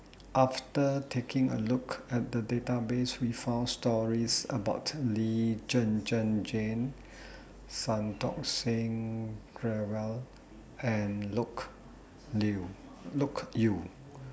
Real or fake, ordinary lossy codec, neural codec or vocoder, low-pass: real; none; none; none